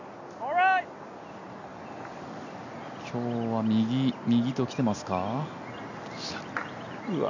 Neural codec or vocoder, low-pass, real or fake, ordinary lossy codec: none; 7.2 kHz; real; none